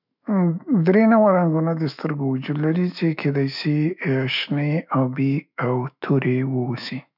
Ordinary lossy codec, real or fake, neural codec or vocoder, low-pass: none; fake; autoencoder, 48 kHz, 128 numbers a frame, DAC-VAE, trained on Japanese speech; 5.4 kHz